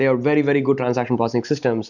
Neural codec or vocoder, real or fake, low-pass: none; real; 7.2 kHz